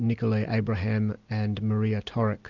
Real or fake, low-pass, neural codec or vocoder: real; 7.2 kHz; none